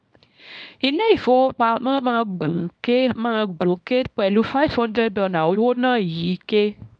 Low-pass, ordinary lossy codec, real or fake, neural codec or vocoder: 9.9 kHz; none; fake; codec, 24 kHz, 0.9 kbps, WavTokenizer, small release